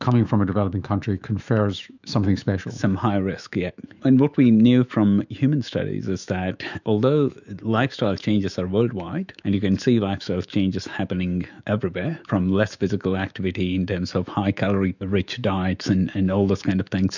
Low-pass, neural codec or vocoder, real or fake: 7.2 kHz; autoencoder, 48 kHz, 128 numbers a frame, DAC-VAE, trained on Japanese speech; fake